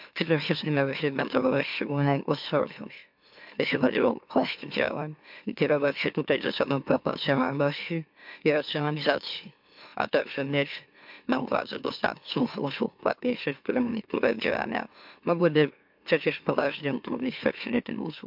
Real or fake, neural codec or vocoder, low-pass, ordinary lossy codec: fake; autoencoder, 44.1 kHz, a latent of 192 numbers a frame, MeloTTS; 5.4 kHz; MP3, 48 kbps